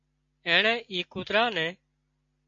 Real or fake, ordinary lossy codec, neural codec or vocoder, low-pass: real; MP3, 48 kbps; none; 7.2 kHz